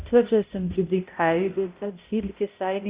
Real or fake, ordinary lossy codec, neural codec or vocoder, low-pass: fake; Opus, 64 kbps; codec, 16 kHz, 0.5 kbps, X-Codec, HuBERT features, trained on balanced general audio; 3.6 kHz